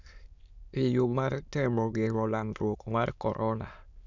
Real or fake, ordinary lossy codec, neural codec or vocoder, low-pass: fake; none; autoencoder, 22.05 kHz, a latent of 192 numbers a frame, VITS, trained on many speakers; 7.2 kHz